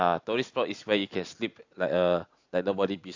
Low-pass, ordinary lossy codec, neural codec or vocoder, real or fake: 7.2 kHz; AAC, 48 kbps; none; real